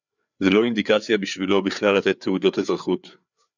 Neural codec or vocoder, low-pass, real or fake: codec, 16 kHz, 4 kbps, FreqCodec, larger model; 7.2 kHz; fake